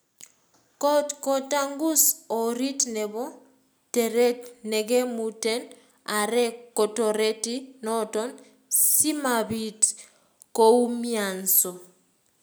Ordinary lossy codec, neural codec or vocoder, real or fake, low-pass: none; none; real; none